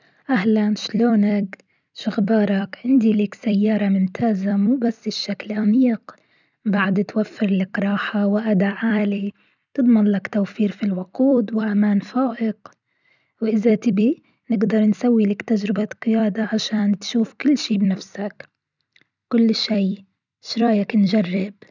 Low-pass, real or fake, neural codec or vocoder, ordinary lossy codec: 7.2 kHz; fake; vocoder, 44.1 kHz, 128 mel bands every 256 samples, BigVGAN v2; none